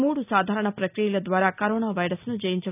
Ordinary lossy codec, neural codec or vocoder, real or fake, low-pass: none; none; real; 3.6 kHz